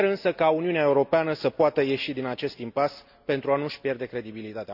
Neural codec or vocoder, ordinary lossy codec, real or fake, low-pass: none; none; real; 5.4 kHz